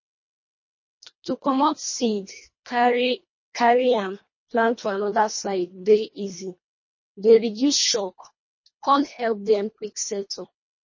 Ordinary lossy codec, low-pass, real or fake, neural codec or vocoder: MP3, 32 kbps; 7.2 kHz; fake; codec, 24 kHz, 1.5 kbps, HILCodec